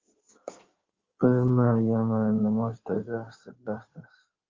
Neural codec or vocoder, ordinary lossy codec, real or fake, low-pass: codec, 16 kHz in and 24 kHz out, 2.2 kbps, FireRedTTS-2 codec; Opus, 24 kbps; fake; 7.2 kHz